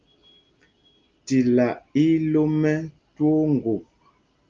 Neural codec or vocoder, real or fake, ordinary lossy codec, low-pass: none; real; Opus, 32 kbps; 7.2 kHz